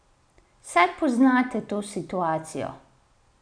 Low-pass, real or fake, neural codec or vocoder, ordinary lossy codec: 9.9 kHz; real; none; none